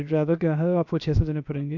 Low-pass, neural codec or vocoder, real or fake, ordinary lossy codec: 7.2 kHz; codec, 16 kHz, 0.7 kbps, FocalCodec; fake; none